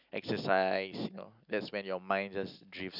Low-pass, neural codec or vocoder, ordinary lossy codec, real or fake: 5.4 kHz; none; none; real